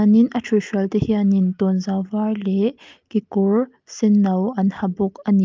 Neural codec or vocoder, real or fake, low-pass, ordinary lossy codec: none; real; 7.2 kHz; Opus, 24 kbps